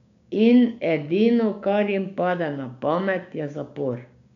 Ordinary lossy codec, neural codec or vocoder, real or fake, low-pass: MP3, 64 kbps; codec, 16 kHz, 6 kbps, DAC; fake; 7.2 kHz